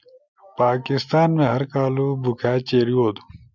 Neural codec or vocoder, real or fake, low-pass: none; real; 7.2 kHz